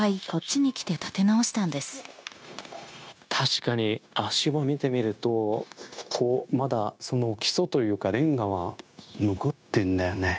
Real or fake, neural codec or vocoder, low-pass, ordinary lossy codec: fake; codec, 16 kHz, 0.9 kbps, LongCat-Audio-Codec; none; none